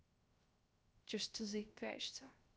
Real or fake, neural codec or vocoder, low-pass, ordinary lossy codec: fake; codec, 16 kHz, 0.3 kbps, FocalCodec; none; none